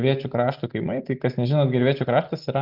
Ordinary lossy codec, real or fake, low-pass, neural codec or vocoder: Opus, 32 kbps; real; 5.4 kHz; none